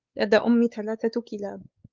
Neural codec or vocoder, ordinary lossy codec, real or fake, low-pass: codec, 16 kHz, 4 kbps, X-Codec, WavLM features, trained on Multilingual LibriSpeech; Opus, 24 kbps; fake; 7.2 kHz